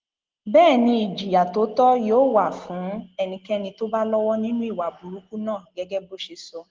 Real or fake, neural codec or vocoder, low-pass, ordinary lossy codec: real; none; 7.2 kHz; Opus, 16 kbps